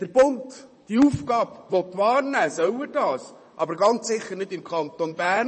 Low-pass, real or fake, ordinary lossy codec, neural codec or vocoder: 10.8 kHz; fake; MP3, 32 kbps; vocoder, 44.1 kHz, 128 mel bands, Pupu-Vocoder